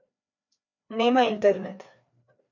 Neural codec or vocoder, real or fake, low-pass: codec, 16 kHz, 2 kbps, FreqCodec, larger model; fake; 7.2 kHz